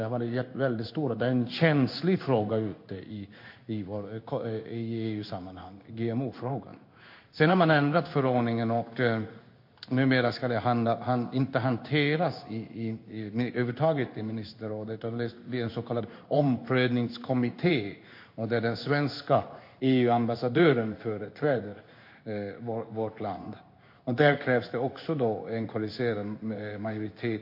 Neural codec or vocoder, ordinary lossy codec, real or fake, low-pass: codec, 16 kHz in and 24 kHz out, 1 kbps, XY-Tokenizer; MP3, 32 kbps; fake; 5.4 kHz